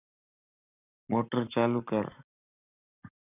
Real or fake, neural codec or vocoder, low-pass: fake; codec, 44.1 kHz, 7.8 kbps, Pupu-Codec; 3.6 kHz